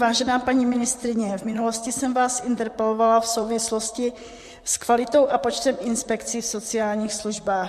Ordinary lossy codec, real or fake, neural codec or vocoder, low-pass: MP3, 64 kbps; fake; vocoder, 44.1 kHz, 128 mel bands, Pupu-Vocoder; 14.4 kHz